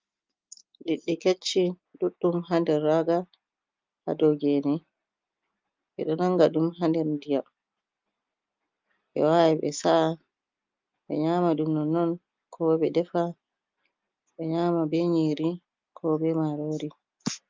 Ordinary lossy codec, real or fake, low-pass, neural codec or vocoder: Opus, 24 kbps; real; 7.2 kHz; none